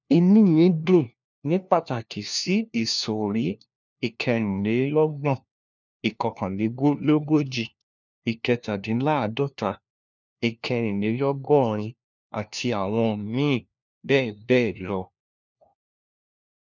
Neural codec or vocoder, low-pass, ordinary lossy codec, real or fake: codec, 16 kHz, 1 kbps, FunCodec, trained on LibriTTS, 50 frames a second; 7.2 kHz; none; fake